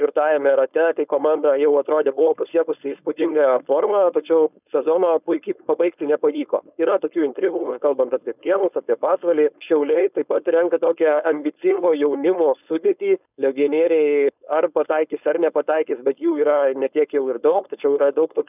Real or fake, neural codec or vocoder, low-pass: fake; codec, 16 kHz, 4.8 kbps, FACodec; 3.6 kHz